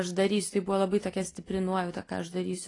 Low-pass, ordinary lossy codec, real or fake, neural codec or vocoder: 10.8 kHz; AAC, 32 kbps; real; none